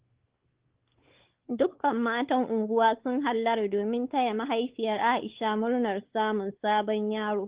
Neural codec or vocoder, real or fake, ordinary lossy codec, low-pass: codec, 16 kHz, 4 kbps, X-Codec, WavLM features, trained on Multilingual LibriSpeech; fake; Opus, 16 kbps; 3.6 kHz